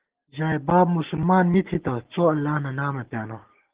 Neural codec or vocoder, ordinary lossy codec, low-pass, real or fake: none; Opus, 16 kbps; 3.6 kHz; real